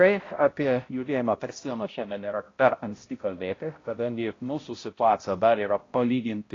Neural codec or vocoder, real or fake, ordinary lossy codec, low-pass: codec, 16 kHz, 0.5 kbps, X-Codec, HuBERT features, trained on balanced general audio; fake; AAC, 32 kbps; 7.2 kHz